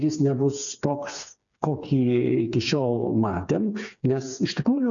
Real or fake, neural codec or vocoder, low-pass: fake; codec, 16 kHz, 4 kbps, FreqCodec, smaller model; 7.2 kHz